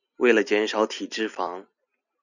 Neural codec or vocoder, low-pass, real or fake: none; 7.2 kHz; real